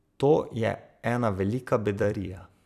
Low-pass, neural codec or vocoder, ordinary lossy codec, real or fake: 14.4 kHz; vocoder, 44.1 kHz, 128 mel bands every 256 samples, BigVGAN v2; none; fake